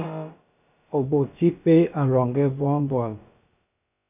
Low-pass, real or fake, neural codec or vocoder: 3.6 kHz; fake; codec, 16 kHz, about 1 kbps, DyCAST, with the encoder's durations